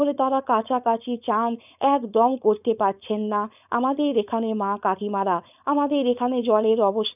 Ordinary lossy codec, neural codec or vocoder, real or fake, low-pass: none; codec, 16 kHz, 4.8 kbps, FACodec; fake; 3.6 kHz